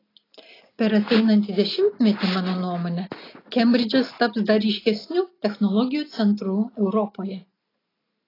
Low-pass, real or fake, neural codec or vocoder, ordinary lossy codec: 5.4 kHz; real; none; AAC, 24 kbps